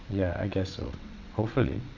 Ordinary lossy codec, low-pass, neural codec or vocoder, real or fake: none; 7.2 kHz; vocoder, 22.05 kHz, 80 mel bands, WaveNeXt; fake